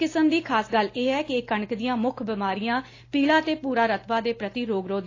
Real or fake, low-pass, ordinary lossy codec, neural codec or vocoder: real; 7.2 kHz; AAC, 32 kbps; none